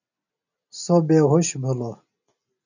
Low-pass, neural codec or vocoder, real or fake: 7.2 kHz; none; real